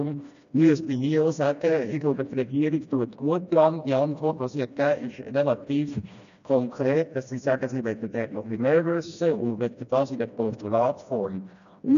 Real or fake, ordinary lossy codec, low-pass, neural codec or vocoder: fake; AAC, 96 kbps; 7.2 kHz; codec, 16 kHz, 1 kbps, FreqCodec, smaller model